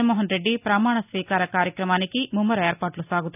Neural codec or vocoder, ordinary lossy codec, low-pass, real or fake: none; none; 3.6 kHz; real